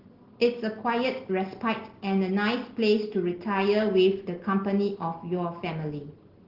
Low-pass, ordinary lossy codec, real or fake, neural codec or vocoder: 5.4 kHz; Opus, 16 kbps; real; none